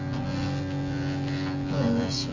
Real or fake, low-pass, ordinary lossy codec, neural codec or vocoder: fake; 7.2 kHz; MP3, 48 kbps; vocoder, 24 kHz, 100 mel bands, Vocos